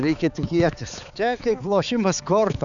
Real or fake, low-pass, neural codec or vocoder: fake; 7.2 kHz; codec, 16 kHz, 4 kbps, X-Codec, HuBERT features, trained on balanced general audio